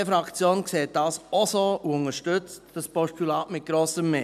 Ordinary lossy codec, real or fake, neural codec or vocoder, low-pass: none; real; none; 14.4 kHz